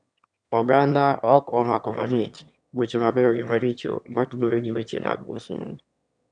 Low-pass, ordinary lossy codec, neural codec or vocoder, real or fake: 9.9 kHz; none; autoencoder, 22.05 kHz, a latent of 192 numbers a frame, VITS, trained on one speaker; fake